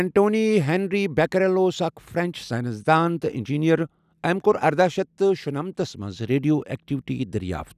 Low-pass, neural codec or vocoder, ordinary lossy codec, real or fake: 14.4 kHz; none; none; real